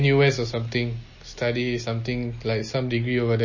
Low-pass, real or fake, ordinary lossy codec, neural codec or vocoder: 7.2 kHz; real; MP3, 32 kbps; none